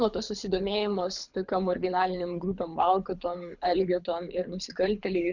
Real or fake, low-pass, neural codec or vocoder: fake; 7.2 kHz; codec, 24 kHz, 6 kbps, HILCodec